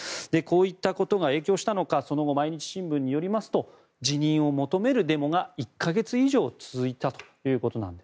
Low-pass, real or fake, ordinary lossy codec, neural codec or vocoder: none; real; none; none